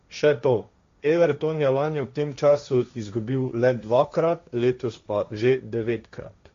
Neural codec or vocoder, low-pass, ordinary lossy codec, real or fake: codec, 16 kHz, 1.1 kbps, Voila-Tokenizer; 7.2 kHz; MP3, 48 kbps; fake